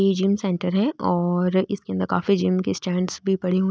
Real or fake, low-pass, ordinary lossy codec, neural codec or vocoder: real; none; none; none